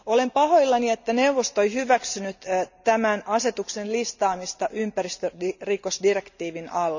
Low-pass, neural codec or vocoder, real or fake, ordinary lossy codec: 7.2 kHz; none; real; none